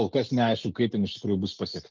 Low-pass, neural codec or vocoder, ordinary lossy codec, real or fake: 7.2 kHz; none; Opus, 24 kbps; real